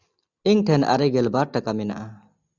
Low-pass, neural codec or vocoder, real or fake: 7.2 kHz; none; real